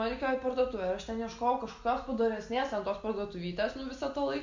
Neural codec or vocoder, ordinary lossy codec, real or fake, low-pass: none; MP3, 64 kbps; real; 7.2 kHz